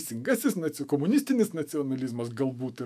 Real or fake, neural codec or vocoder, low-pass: fake; autoencoder, 48 kHz, 128 numbers a frame, DAC-VAE, trained on Japanese speech; 14.4 kHz